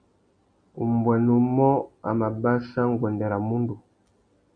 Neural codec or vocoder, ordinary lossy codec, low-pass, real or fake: none; MP3, 48 kbps; 9.9 kHz; real